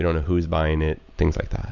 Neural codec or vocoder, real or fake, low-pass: none; real; 7.2 kHz